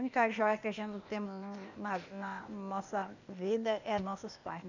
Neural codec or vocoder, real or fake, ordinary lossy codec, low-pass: codec, 16 kHz, 0.8 kbps, ZipCodec; fake; none; 7.2 kHz